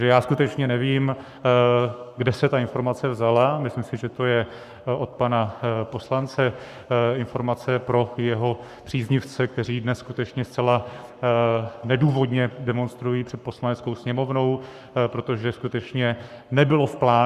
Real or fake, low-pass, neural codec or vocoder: fake; 14.4 kHz; codec, 44.1 kHz, 7.8 kbps, Pupu-Codec